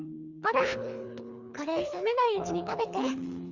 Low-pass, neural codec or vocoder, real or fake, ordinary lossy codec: 7.2 kHz; codec, 24 kHz, 3 kbps, HILCodec; fake; Opus, 64 kbps